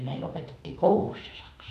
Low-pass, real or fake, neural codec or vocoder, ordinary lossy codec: 14.4 kHz; fake; autoencoder, 48 kHz, 32 numbers a frame, DAC-VAE, trained on Japanese speech; none